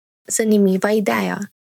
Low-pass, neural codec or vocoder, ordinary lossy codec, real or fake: 19.8 kHz; vocoder, 44.1 kHz, 128 mel bands every 512 samples, BigVGAN v2; none; fake